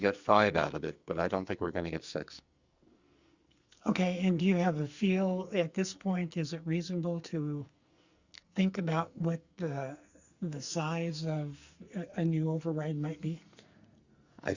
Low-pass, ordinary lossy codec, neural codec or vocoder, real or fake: 7.2 kHz; Opus, 64 kbps; codec, 44.1 kHz, 2.6 kbps, SNAC; fake